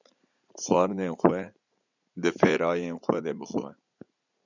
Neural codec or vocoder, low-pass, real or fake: vocoder, 44.1 kHz, 80 mel bands, Vocos; 7.2 kHz; fake